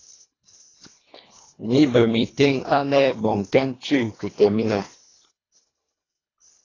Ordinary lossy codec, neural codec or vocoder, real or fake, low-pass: AAC, 32 kbps; codec, 24 kHz, 1.5 kbps, HILCodec; fake; 7.2 kHz